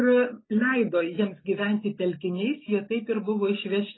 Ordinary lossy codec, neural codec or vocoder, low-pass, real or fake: AAC, 16 kbps; none; 7.2 kHz; real